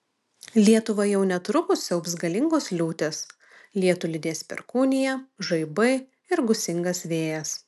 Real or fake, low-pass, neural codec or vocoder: real; 14.4 kHz; none